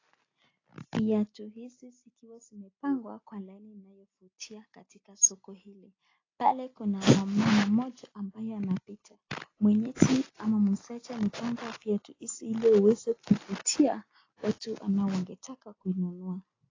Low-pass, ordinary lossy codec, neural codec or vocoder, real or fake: 7.2 kHz; AAC, 32 kbps; none; real